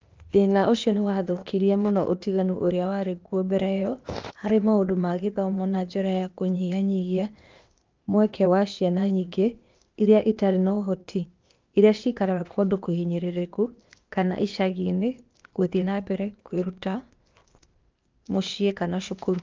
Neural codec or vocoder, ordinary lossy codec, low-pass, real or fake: codec, 16 kHz, 0.8 kbps, ZipCodec; Opus, 24 kbps; 7.2 kHz; fake